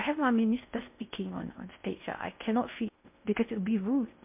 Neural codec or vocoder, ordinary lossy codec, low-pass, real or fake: codec, 16 kHz in and 24 kHz out, 0.6 kbps, FocalCodec, streaming, 4096 codes; MP3, 32 kbps; 3.6 kHz; fake